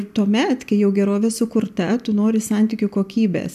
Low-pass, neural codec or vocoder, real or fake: 14.4 kHz; none; real